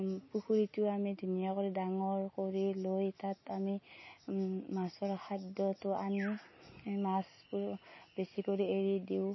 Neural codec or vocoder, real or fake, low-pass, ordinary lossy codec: none; real; 7.2 kHz; MP3, 24 kbps